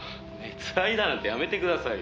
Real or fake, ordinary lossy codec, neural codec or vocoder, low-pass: real; none; none; none